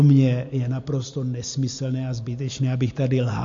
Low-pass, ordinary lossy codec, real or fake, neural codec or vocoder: 7.2 kHz; MP3, 48 kbps; real; none